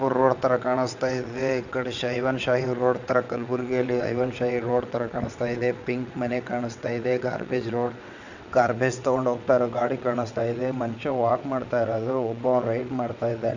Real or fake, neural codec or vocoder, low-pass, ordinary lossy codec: fake; vocoder, 22.05 kHz, 80 mel bands, WaveNeXt; 7.2 kHz; none